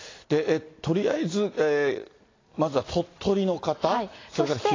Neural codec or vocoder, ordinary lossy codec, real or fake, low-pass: none; AAC, 32 kbps; real; 7.2 kHz